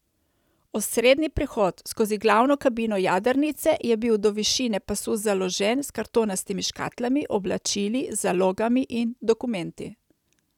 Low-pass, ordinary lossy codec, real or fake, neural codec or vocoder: 19.8 kHz; none; real; none